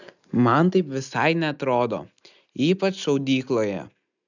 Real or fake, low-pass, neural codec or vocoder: real; 7.2 kHz; none